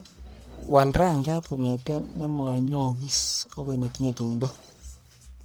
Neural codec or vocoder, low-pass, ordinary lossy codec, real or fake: codec, 44.1 kHz, 1.7 kbps, Pupu-Codec; none; none; fake